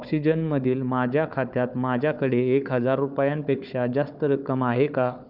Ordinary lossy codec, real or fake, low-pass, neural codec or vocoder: none; fake; 5.4 kHz; codec, 16 kHz, 4 kbps, FunCodec, trained on Chinese and English, 50 frames a second